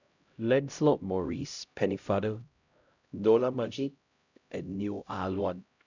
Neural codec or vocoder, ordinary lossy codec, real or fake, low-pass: codec, 16 kHz, 0.5 kbps, X-Codec, HuBERT features, trained on LibriSpeech; none; fake; 7.2 kHz